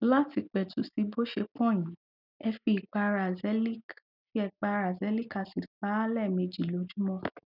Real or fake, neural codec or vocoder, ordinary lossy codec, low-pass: real; none; none; 5.4 kHz